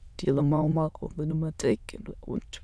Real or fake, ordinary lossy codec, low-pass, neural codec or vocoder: fake; none; none; autoencoder, 22.05 kHz, a latent of 192 numbers a frame, VITS, trained on many speakers